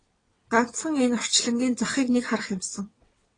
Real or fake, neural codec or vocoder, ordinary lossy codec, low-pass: fake; vocoder, 22.05 kHz, 80 mel bands, WaveNeXt; AAC, 32 kbps; 9.9 kHz